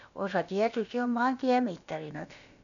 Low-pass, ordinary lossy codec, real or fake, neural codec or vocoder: 7.2 kHz; none; fake; codec, 16 kHz, about 1 kbps, DyCAST, with the encoder's durations